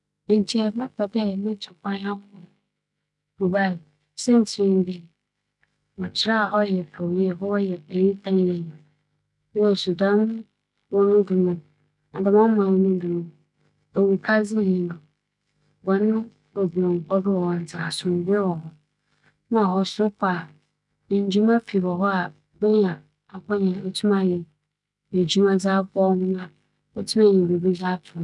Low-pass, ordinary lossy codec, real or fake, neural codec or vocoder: 10.8 kHz; none; fake; autoencoder, 48 kHz, 128 numbers a frame, DAC-VAE, trained on Japanese speech